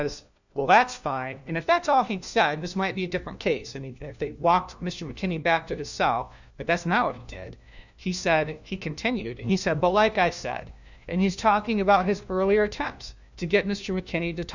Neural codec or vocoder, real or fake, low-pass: codec, 16 kHz, 1 kbps, FunCodec, trained on LibriTTS, 50 frames a second; fake; 7.2 kHz